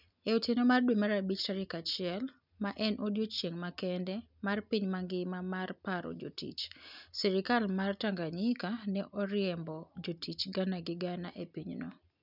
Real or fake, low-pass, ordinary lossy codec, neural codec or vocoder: real; 5.4 kHz; none; none